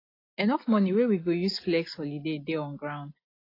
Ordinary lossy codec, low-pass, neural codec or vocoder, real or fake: AAC, 24 kbps; 5.4 kHz; none; real